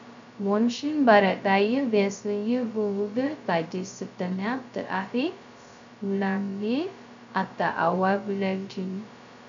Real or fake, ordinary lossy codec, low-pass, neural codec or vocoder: fake; MP3, 64 kbps; 7.2 kHz; codec, 16 kHz, 0.2 kbps, FocalCodec